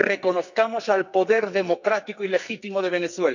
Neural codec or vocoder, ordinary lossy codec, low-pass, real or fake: codec, 44.1 kHz, 2.6 kbps, SNAC; none; 7.2 kHz; fake